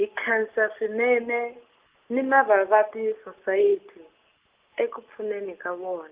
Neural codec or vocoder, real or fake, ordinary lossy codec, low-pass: none; real; Opus, 32 kbps; 3.6 kHz